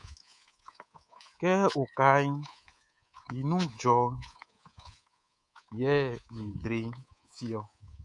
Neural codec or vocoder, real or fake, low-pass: codec, 24 kHz, 3.1 kbps, DualCodec; fake; 10.8 kHz